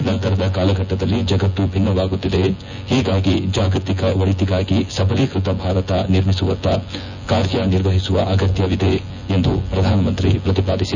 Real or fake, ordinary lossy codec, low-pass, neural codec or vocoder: fake; none; 7.2 kHz; vocoder, 24 kHz, 100 mel bands, Vocos